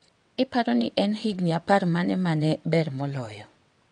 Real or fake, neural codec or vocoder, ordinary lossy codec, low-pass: fake; vocoder, 22.05 kHz, 80 mel bands, WaveNeXt; MP3, 64 kbps; 9.9 kHz